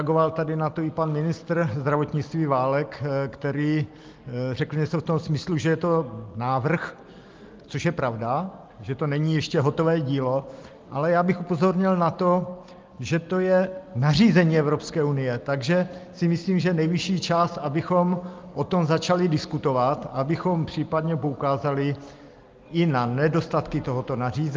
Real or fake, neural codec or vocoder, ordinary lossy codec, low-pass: real; none; Opus, 32 kbps; 7.2 kHz